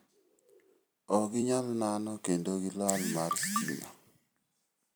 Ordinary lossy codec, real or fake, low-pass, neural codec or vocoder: none; fake; none; vocoder, 44.1 kHz, 128 mel bands every 256 samples, BigVGAN v2